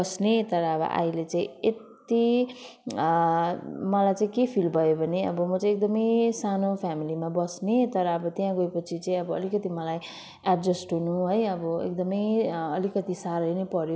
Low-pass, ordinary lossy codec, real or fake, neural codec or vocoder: none; none; real; none